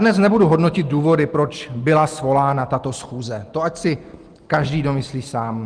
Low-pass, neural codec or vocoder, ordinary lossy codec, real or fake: 9.9 kHz; none; Opus, 24 kbps; real